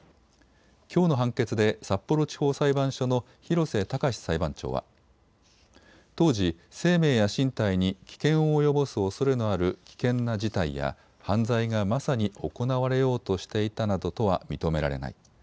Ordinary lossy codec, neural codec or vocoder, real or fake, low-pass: none; none; real; none